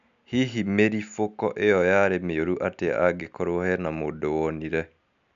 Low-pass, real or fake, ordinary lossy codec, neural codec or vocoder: 7.2 kHz; real; none; none